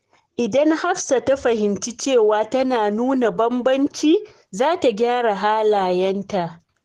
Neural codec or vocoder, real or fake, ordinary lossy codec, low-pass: codec, 44.1 kHz, 7.8 kbps, Pupu-Codec; fake; Opus, 16 kbps; 14.4 kHz